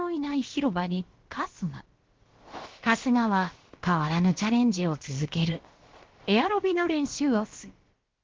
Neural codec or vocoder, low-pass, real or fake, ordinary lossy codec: codec, 16 kHz, about 1 kbps, DyCAST, with the encoder's durations; 7.2 kHz; fake; Opus, 16 kbps